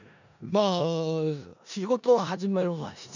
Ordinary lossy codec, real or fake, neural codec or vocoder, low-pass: none; fake; codec, 16 kHz in and 24 kHz out, 0.4 kbps, LongCat-Audio-Codec, four codebook decoder; 7.2 kHz